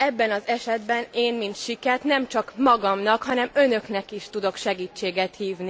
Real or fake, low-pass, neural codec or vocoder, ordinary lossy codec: real; none; none; none